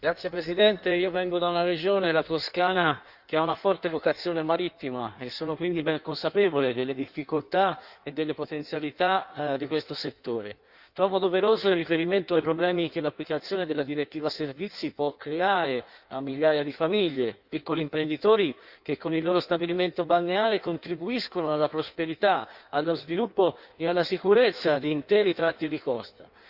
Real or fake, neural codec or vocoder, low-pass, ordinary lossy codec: fake; codec, 16 kHz in and 24 kHz out, 1.1 kbps, FireRedTTS-2 codec; 5.4 kHz; Opus, 64 kbps